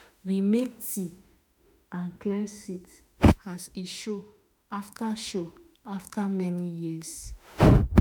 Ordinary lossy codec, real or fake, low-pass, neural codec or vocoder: none; fake; none; autoencoder, 48 kHz, 32 numbers a frame, DAC-VAE, trained on Japanese speech